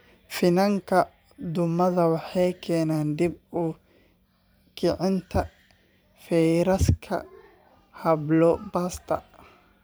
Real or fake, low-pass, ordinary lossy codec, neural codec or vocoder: fake; none; none; vocoder, 44.1 kHz, 128 mel bands every 512 samples, BigVGAN v2